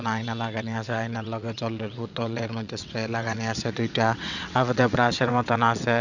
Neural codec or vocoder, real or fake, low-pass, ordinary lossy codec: vocoder, 22.05 kHz, 80 mel bands, WaveNeXt; fake; 7.2 kHz; none